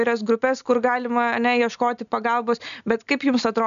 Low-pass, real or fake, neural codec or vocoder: 7.2 kHz; real; none